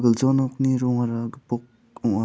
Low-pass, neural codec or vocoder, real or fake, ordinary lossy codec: none; none; real; none